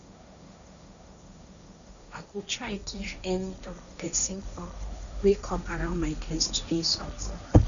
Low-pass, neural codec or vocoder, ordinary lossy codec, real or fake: 7.2 kHz; codec, 16 kHz, 1.1 kbps, Voila-Tokenizer; none; fake